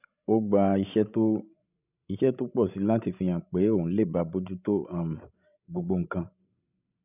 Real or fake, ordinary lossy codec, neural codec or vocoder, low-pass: fake; none; codec, 16 kHz, 16 kbps, FreqCodec, larger model; 3.6 kHz